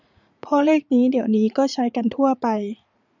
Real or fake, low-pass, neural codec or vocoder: fake; 7.2 kHz; vocoder, 44.1 kHz, 80 mel bands, Vocos